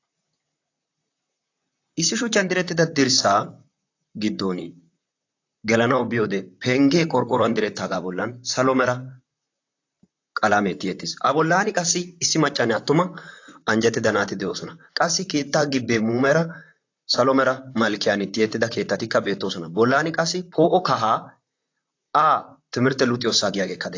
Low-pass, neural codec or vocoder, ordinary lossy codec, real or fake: 7.2 kHz; vocoder, 44.1 kHz, 128 mel bands, Pupu-Vocoder; AAC, 48 kbps; fake